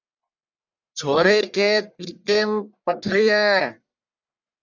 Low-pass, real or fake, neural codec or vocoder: 7.2 kHz; fake; codec, 44.1 kHz, 1.7 kbps, Pupu-Codec